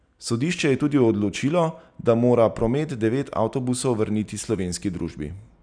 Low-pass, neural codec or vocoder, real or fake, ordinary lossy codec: 9.9 kHz; none; real; none